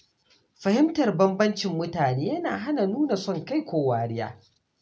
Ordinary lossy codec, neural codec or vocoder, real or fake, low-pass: none; none; real; none